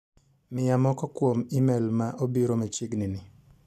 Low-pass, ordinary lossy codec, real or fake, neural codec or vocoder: 14.4 kHz; none; real; none